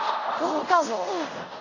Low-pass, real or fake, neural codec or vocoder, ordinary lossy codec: 7.2 kHz; fake; codec, 24 kHz, 0.5 kbps, DualCodec; Opus, 64 kbps